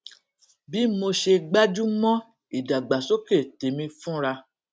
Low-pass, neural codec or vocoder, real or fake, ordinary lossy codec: none; none; real; none